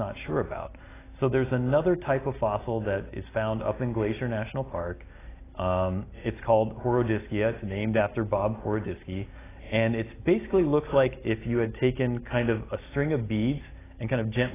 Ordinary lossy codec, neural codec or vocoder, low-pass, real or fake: AAC, 16 kbps; none; 3.6 kHz; real